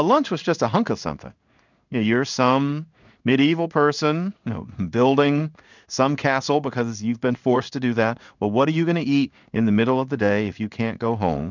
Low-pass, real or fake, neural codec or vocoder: 7.2 kHz; fake; codec, 16 kHz in and 24 kHz out, 1 kbps, XY-Tokenizer